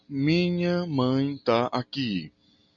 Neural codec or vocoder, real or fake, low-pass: none; real; 7.2 kHz